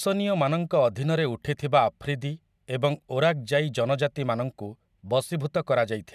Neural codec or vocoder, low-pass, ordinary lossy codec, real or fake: none; 14.4 kHz; none; real